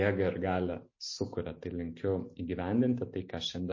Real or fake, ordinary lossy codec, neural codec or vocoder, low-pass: real; MP3, 32 kbps; none; 7.2 kHz